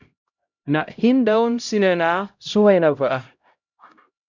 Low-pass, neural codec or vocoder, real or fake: 7.2 kHz; codec, 16 kHz, 0.5 kbps, X-Codec, HuBERT features, trained on LibriSpeech; fake